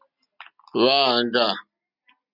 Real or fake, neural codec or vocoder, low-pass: real; none; 5.4 kHz